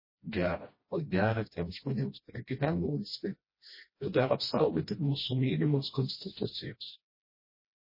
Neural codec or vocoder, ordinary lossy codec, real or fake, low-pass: codec, 16 kHz, 1 kbps, FreqCodec, smaller model; MP3, 24 kbps; fake; 5.4 kHz